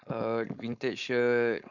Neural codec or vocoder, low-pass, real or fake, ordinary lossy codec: codec, 16 kHz, 4.8 kbps, FACodec; 7.2 kHz; fake; none